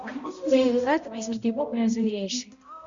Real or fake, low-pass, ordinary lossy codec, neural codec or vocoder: fake; 7.2 kHz; Opus, 64 kbps; codec, 16 kHz, 0.5 kbps, X-Codec, HuBERT features, trained on balanced general audio